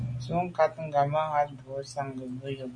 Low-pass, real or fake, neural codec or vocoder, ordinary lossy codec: 9.9 kHz; real; none; MP3, 96 kbps